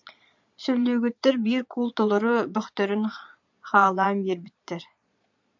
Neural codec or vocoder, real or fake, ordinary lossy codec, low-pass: vocoder, 22.05 kHz, 80 mel bands, Vocos; fake; MP3, 64 kbps; 7.2 kHz